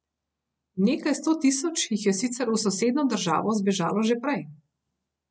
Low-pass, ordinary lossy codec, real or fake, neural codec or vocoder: none; none; real; none